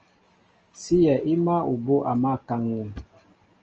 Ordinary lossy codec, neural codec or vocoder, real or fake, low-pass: Opus, 24 kbps; none; real; 7.2 kHz